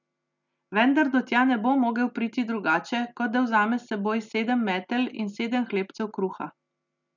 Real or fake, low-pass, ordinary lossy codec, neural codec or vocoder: real; 7.2 kHz; none; none